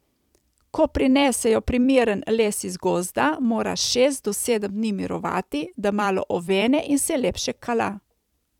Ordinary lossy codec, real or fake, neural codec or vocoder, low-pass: none; fake; vocoder, 44.1 kHz, 128 mel bands every 256 samples, BigVGAN v2; 19.8 kHz